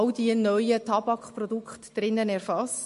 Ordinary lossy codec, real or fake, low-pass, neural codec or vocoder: MP3, 48 kbps; real; 14.4 kHz; none